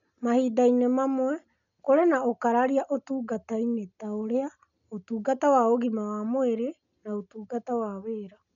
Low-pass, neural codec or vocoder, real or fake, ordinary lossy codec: 7.2 kHz; none; real; none